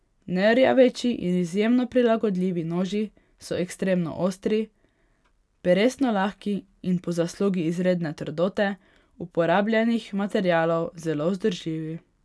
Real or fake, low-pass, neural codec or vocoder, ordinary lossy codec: real; none; none; none